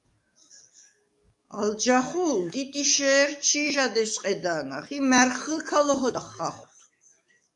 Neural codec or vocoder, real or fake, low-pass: codec, 44.1 kHz, 7.8 kbps, DAC; fake; 10.8 kHz